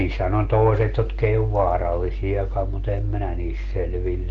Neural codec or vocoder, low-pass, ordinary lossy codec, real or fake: none; 7.2 kHz; Opus, 16 kbps; real